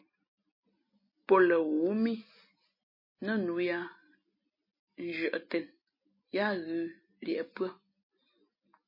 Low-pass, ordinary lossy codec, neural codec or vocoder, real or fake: 5.4 kHz; MP3, 24 kbps; none; real